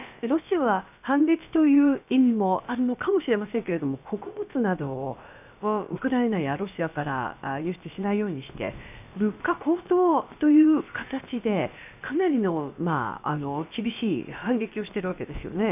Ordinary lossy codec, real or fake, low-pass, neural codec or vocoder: none; fake; 3.6 kHz; codec, 16 kHz, about 1 kbps, DyCAST, with the encoder's durations